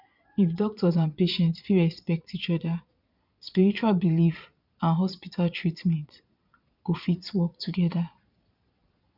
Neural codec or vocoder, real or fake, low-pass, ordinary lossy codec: vocoder, 22.05 kHz, 80 mel bands, Vocos; fake; 5.4 kHz; none